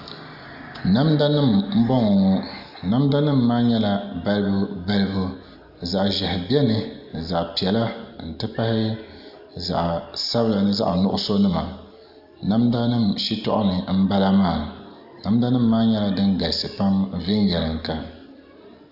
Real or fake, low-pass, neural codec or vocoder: real; 5.4 kHz; none